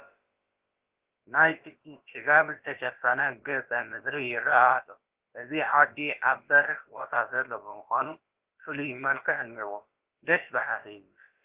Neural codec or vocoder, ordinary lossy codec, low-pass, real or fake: codec, 16 kHz, about 1 kbps, DyCAST, with the encoder's durations; Opus, 16 kbps; 3.6 kHz; fake